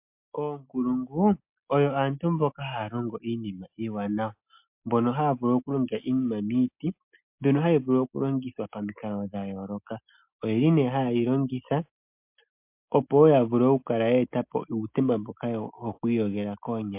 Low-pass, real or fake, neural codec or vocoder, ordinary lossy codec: 3.6 kHz; real; none; MP3, 32 kbps